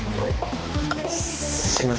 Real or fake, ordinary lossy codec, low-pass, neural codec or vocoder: fake; none; none; codec, 16 kHz, 2 kbps, X-Codec, HuBERT features, trained on balanced general audio